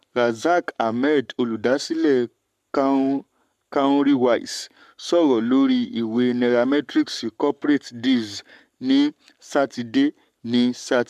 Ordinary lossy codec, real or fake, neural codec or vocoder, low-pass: MP3, 96 kbps; fake; codec, 44.1 kHz, 7.8 kbps, Pupu-Codec; 14.4 kHz